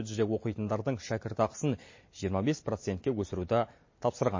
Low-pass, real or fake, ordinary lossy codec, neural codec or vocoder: 7.2 kHz; real; MP3, 32 kbps; none